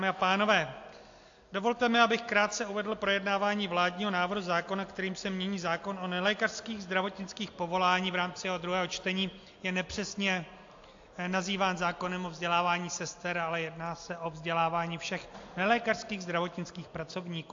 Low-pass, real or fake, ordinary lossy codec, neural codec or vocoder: 7.2 kHz; real; AAC, 48 kbps; none